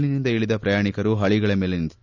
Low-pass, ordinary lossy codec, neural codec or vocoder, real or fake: 7.2 kHz; none; none; real